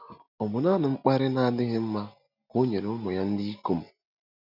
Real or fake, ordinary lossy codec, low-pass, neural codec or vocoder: real; none; 5.4 kHz; none